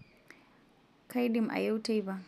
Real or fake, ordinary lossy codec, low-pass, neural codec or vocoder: real; none; 14.4 kHz; none